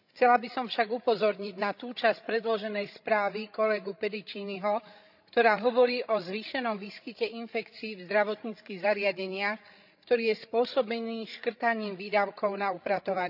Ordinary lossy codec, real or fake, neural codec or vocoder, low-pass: none; fake; codec, 16 kHz, 16 kbps, FreqCodec, larger model; 5.4 kHz